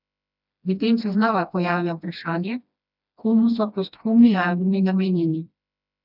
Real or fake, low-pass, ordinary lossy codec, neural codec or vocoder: fake; 5.4 kHz; none; codec, 16 kHz, 1 kbps, FreqCodec, smaller model